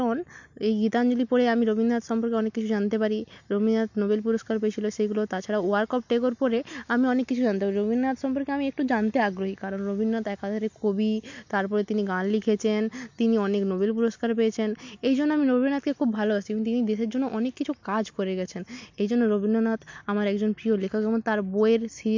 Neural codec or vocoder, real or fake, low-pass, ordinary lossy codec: none; real; 7.2 kHz; MP3, 48 kbps